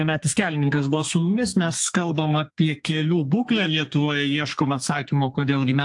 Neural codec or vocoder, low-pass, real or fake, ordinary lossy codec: codec, 32 kHz, 1.9 kbps, SNAC; 10.8 kHz; fake; AAC, 64 kbps